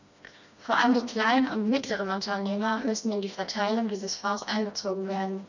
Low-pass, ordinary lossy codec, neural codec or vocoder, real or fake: 7.2 kHz; none; codec, 16 kHz, 1 kbps, FreqCodec, smaller model; fake